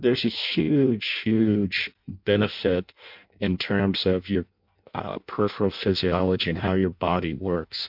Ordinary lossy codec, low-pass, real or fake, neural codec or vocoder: MP3, 48 kbps; 5.4 kHz; fake; codec, 16 kHz in and 24 kHz out, 0.6 kbps, FireRedTTS-2 codec